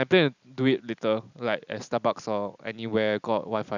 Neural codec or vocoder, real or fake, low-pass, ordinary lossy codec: none; real; 7.2 kHz; none